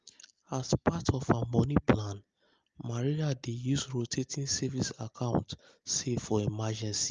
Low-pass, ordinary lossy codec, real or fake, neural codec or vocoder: 7.2 kHz; Opus, 24 kbps; real; none